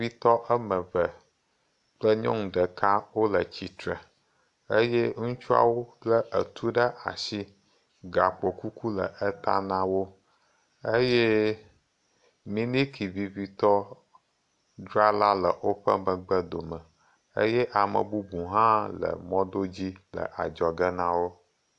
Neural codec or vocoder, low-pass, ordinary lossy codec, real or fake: none; 10.8 kHz; AAC, 64 kbps; real